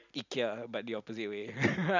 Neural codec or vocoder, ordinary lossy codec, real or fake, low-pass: none; none; real; 7.2 kHz